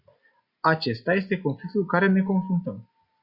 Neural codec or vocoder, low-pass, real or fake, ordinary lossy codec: none; 5.4 kHz; real; Opus, 64 kbps